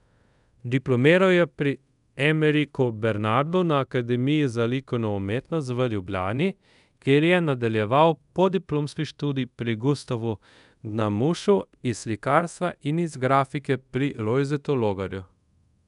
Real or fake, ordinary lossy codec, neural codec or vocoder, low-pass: fake; none; codec, 24 kHz, 0.5 kbps, DualCodec; 10.8 kHz